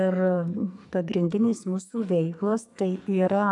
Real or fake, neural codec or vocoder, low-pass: fake; codec, 44.1 kHz, 2.6 kbps, SNAC; 10.8 kHz